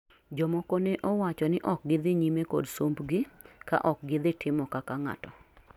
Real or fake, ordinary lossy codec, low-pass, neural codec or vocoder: real; none; 19.8 kHz; none